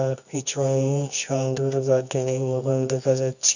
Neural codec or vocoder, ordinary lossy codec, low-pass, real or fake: codec, 24 kHz, 0.9 kbps, WavTokenizer, medium music audio release; none; 7.2 kHz; fake